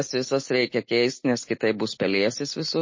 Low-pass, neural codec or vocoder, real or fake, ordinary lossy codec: 7.2 kHz; none; real; MP3, 32 kbps